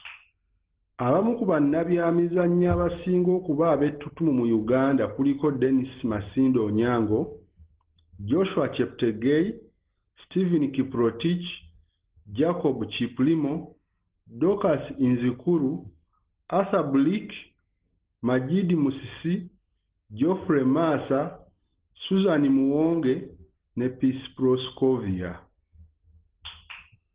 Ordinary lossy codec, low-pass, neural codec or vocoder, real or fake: Opus, 16 kbps; 3.6 kHz; none; real